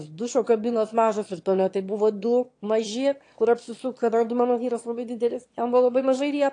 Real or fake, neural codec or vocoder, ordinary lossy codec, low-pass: fake; autoencoder, 22.05 kHz, a latent of 192 numbers a frame, VITS, trained on one speaker; AAC, 48 kbps; 9.9 kHz